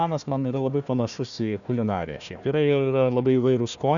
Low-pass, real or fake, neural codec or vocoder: 7.2 kHz; fake; codec, 16 kHz, 1 kbps, FunCodec, trained on Chinese and English, 50 frames a second